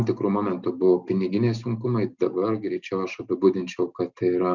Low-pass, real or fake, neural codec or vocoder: 7.2 kHz; real; none